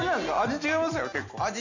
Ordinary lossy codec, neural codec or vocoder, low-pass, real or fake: none; none; 7.2 kHz; real